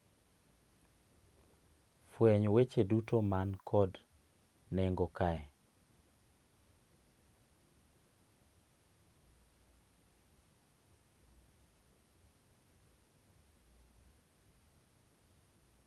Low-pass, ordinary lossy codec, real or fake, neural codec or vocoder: 19.8 kHz; Opus, 32 kbps; fake; vocoder, 44.1 kHz, 128 mel bands every 256 samples, BigVGAN v2